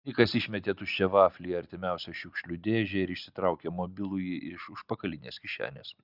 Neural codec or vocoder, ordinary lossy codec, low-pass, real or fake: none; Opus, 64 kbps; 5.4 kHz; real